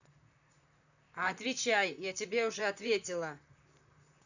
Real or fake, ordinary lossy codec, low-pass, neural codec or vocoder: fake; none; 7.2 kHz; vocoder, 44.1 kHz, 128 mel bands, Pupu-Vocoder